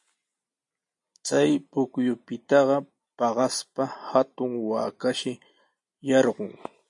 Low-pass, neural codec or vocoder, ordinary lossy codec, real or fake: 10.8 kHz; none; MP3, 48 kbps; real